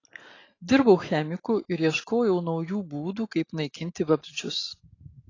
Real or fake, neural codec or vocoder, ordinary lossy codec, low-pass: real; none; AAC, 32 kbps; 7.2 kHz